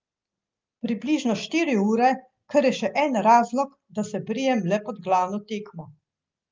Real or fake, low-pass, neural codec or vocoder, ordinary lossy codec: real; 7.2 kHz; none; Opus, 32 kbps